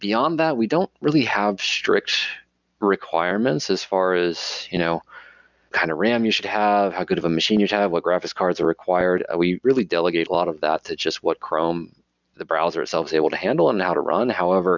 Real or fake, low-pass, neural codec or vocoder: real; 7.2 kHz; none